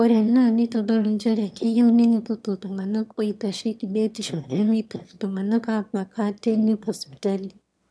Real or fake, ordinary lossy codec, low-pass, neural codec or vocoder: fake; none; none; autoencoder, 22.05 kHz, a latent of 192 numbers a frame, VITS, trained on one speaker